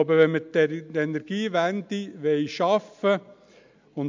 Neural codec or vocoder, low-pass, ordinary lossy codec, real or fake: none; 7.2 kHz; MP3, 64 kbps; real